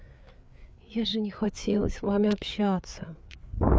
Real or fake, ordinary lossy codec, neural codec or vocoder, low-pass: fake; none; codec, 16 kHz, 4 kbps, FreqCodec, larger model; none